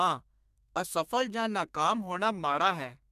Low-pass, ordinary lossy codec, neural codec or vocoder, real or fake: 14.4 kHz; MP3, 96 kbps; codec, 32 kHz, 1.9 kbps, SNAC; fake